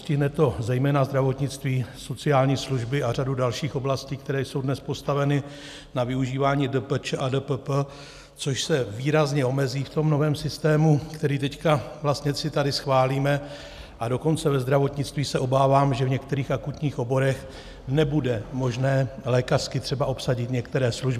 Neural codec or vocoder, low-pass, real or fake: none; 14.4 kHz; real